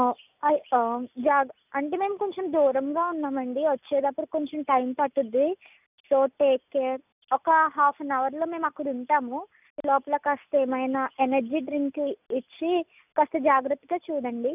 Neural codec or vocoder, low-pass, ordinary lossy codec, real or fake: none; 3.6 kHz; none; real